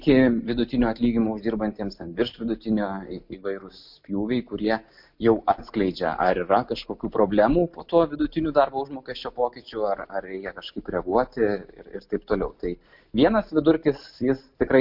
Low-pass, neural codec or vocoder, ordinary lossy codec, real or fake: 5.4 kHz; none; MP3, 48 kbps; real